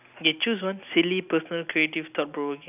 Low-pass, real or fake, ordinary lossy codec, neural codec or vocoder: 3.6 kHz; real; none; none